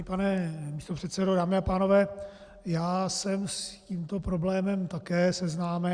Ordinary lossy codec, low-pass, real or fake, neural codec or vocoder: Opus, 64 kbps; 9.9 kHz; real; none